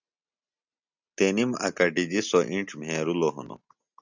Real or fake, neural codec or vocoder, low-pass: real; none; 7.2 kHz